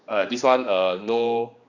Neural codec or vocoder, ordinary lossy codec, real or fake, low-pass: codec, 16 kHz, 2 kbps, X-Codec, HuBERT features, trained on general audio; Opus, 64 kbps; fake; 7.2 kHz